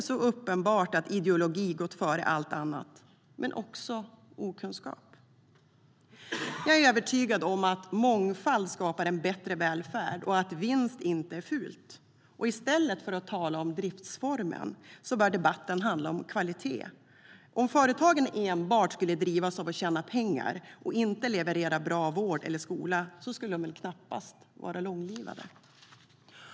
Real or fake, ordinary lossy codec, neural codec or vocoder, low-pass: real; none; none; none